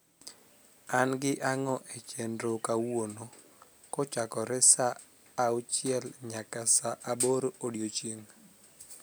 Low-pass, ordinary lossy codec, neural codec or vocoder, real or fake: none; none; none; real